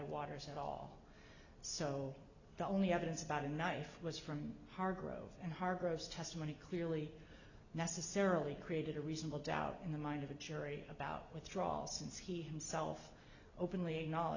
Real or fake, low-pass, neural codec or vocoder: real; 7.2 kHz; none